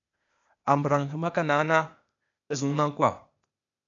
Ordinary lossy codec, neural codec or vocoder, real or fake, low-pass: MP3, 96 kbps; codec, 16 kHz, 0.8 kbps, ZipCodec; fake; 7.2 kHz